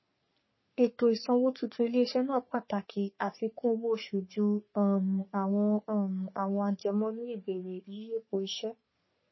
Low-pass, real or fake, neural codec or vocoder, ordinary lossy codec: 7.2 kHz; fake; codec, 44.1 kHz, 3.4 kbps, Pupu-Codec; MP3, 24 kbps